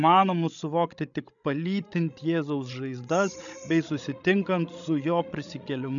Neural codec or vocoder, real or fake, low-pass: codec, 16 kHz, 16 kbps, FreqCodec, larger model; fake; 7.2 kHz